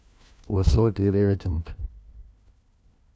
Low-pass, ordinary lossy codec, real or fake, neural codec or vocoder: none; none; fake; codec, 16 kHz, 1 kbps, FunCodec, trained on LibriTTS, 50 frames a second